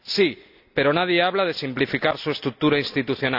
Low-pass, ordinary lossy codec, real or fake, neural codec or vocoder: 5.4 kHz; none; real; none